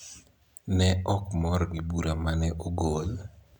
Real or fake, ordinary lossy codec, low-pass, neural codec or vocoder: real; none; 19.8 kHz; none